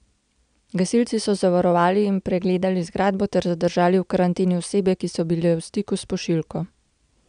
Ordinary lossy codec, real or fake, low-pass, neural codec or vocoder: none; real; 9.9 kHz; none